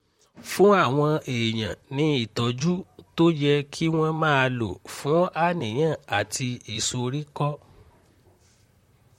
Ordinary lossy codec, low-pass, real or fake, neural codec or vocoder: MP3, 64 kbps; 19.8 kHz; fake; vocoder, 44.1 kHz, 128 mel bands, Pupu-Vocoder